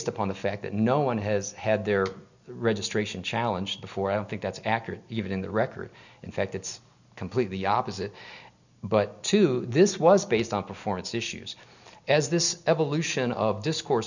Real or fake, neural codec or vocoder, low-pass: real; none; 7.2 kHz